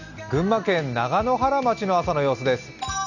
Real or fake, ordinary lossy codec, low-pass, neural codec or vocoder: real; none; 7.2 kHz; none